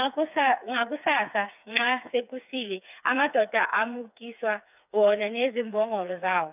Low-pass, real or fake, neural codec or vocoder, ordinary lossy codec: 3.6 kHz; fake; codec, 16 kHz, 4 kbps, FreqCodec, smaller model; none